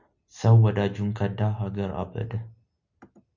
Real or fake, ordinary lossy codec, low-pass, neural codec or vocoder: real; Opus, 64 kbps; 7.2 kHz; none